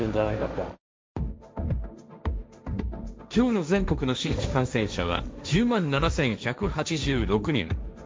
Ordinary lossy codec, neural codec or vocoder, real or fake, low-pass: none; codec, 16 kHz, 1.1 kbps, Voila-Tokenizer; fake; none